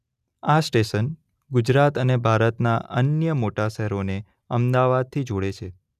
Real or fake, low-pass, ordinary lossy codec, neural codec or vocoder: real; 14.4 kHz; none; none